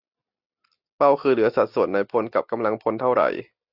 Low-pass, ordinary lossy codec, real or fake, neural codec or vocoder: 5.4 kHz; AAC, 48 kbps; real; none